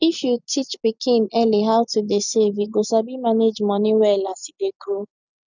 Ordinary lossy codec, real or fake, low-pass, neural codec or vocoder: none; real; 7.2 kHz; none